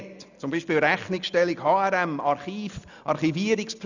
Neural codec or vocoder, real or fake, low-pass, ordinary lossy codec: none; real; 7.2 kHz; none